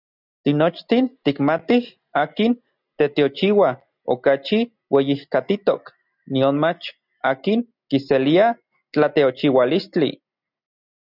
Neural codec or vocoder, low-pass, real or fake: none; 5.4 kHz; real